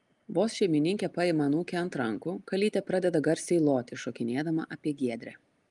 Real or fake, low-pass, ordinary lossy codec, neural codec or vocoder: real; 10.8 kHz; Opus, 32 kbps; none